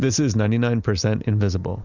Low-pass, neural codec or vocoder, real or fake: 7.2 kHz; none; real